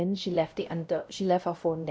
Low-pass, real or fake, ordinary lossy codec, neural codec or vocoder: none; fake; none; codec, 16 kHz, 0.5 kbps, X-Codec, WavLM features, trained on Multilingual LibriSpeech